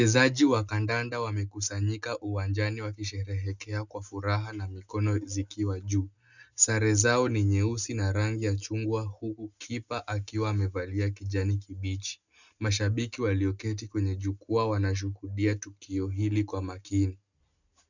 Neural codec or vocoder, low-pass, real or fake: none; 7.2 kHz; real